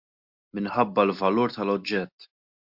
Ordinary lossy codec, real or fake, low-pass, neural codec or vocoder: MP3, 48 kbps; real; 5.4 kHz; none